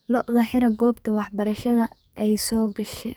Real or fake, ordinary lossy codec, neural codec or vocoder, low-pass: fake; none; codec, 44.1 kHz, 2.6 kbps, SNAC; none